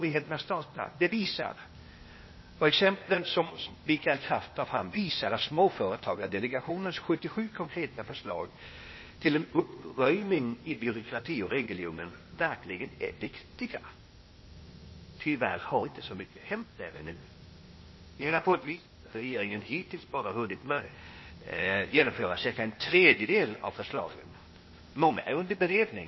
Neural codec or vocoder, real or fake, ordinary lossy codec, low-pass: codec, 16 kHz, 0.8 kbps, ZipCodec; fake; MP3, 24 kbps; 7.2 kHz